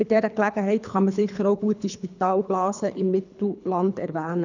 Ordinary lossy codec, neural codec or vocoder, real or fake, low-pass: none; codec, 24 kHz, 3 kbps, HILCodec; fake; 7.2 kHz